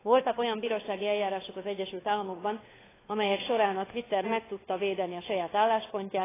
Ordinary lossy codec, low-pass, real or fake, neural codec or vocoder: AAC, 16 kbps; 3.6 kHz; fake; codec, 16 kHz, 4 kbps, FunCodec, trained on LibriTTS, 50 frames a second